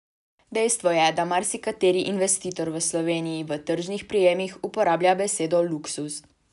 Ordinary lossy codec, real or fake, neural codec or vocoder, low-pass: none; real; none; 10.8 kHz